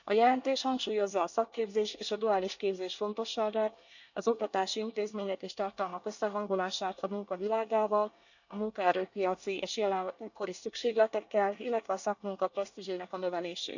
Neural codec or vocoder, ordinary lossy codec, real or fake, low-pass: codec, 24 kHz, 1 kbps, SNAC; none; fake; 7.2 kHz